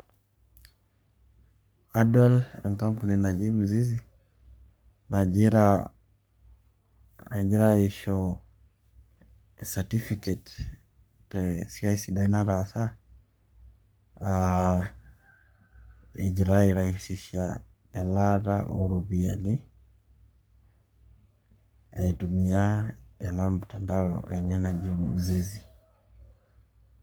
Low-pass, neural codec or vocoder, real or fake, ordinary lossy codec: none; codec, 44.1 kHz, 3.4 kbps, Pupu-Codec; fake; none